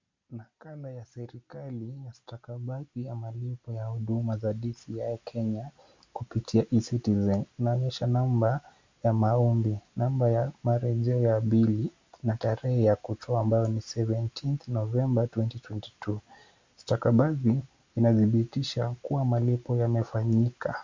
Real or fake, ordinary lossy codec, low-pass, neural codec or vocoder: real; MP3, 64 kbps; 7.2 kHz; none